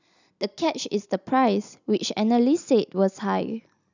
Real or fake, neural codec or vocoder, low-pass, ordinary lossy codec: real; none; 7.2 kHz; none